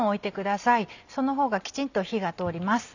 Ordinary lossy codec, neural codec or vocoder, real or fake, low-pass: none; none; real; 7.2 kHz